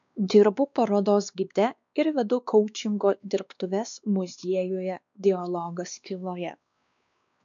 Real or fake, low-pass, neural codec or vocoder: fake; 7.2 kHz; codec, 16 kHz, 2 kbps, X-Codec, WavLM features, trained on Multilingual LibriSpeech